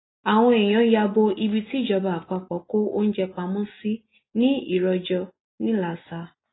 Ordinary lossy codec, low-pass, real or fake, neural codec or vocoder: AAC, 16 kbps; 7.2 kHz; real; none